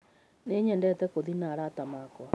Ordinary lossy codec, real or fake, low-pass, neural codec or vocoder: none; real; none; none